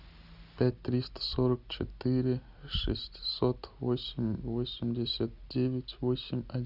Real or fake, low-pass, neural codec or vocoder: real; 5.4 kHz; none